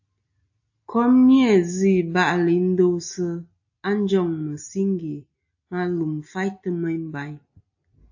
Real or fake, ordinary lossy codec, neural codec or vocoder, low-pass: real; AAC, 48 kbps; none; 7.2 kHz